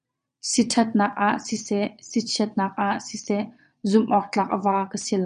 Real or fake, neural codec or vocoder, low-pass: fake; vocoder, 22.05 kHz, 80 mel bands, Vocos; 9.9 kHz